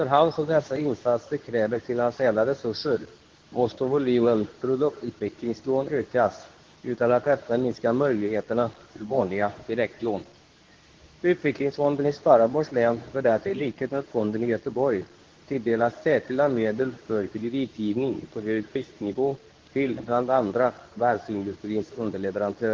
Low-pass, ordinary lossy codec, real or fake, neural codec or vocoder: 7.2 kHz; Opus, 16 kbps; fake; codec, 24 kHz, 0.9 kbps, WavTokenizer, medium speech release version 2